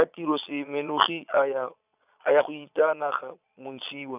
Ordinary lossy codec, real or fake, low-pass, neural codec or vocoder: none; fake; 3.6 kHz; vocoder, 22.05 kHz, 80 mel bands, Vocos